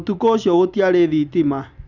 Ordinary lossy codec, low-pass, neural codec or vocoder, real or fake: none; 7.2 kHz; none; real